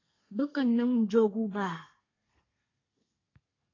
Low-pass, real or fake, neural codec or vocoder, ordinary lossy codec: 7.2 kHz; fake; codec, 32 kHz, 1.9 kbps, SNAC; AAC, 32 kbps